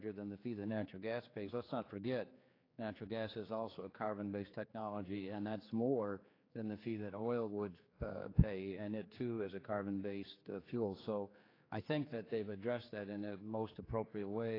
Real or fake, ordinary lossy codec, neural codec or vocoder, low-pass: fake; AAC, 24 kbps; codec, 16 kHz, 4 kbps, X-Codec, HuBERT features, trained on general audio; 5.4 kHz